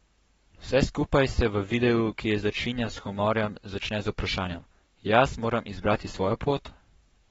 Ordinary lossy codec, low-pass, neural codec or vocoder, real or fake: AAC, 24 kbps; 19.8 kHz; codec, 44.1 kHz, 7.8 kbps, Pupu-Codec; fake